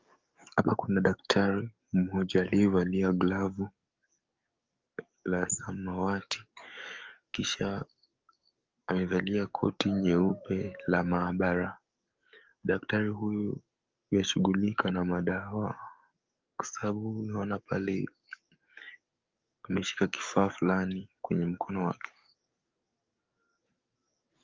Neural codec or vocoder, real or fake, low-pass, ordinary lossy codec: codec, 44.1 kHz, 7.8 kbps, DAC; fake; 7.2 kHz; Opus, 24 kbps